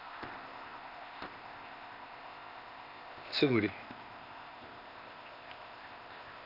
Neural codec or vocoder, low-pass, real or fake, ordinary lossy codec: codec, 16 kHz, 0.8 kbps, ZipCodec; 5.4 kHz; fake; none